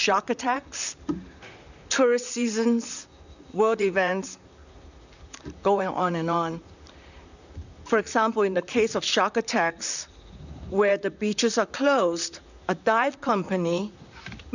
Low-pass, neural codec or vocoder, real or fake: 7.2 kHz; vocoder, 44.1 kHz, 128 mel bands, Pupu-Vocoder; fake